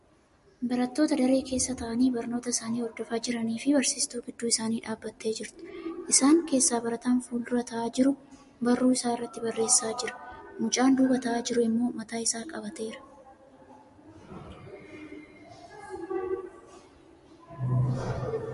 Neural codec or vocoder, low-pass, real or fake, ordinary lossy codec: none; 14.4 kHz; real; MP3, 48 kbps